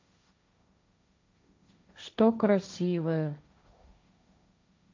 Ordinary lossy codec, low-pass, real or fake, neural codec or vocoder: MP3, 64 kbps; 7.2 kHz; fake; codec, 16 kHz, 1.1 kbps, Voila-Tokenizer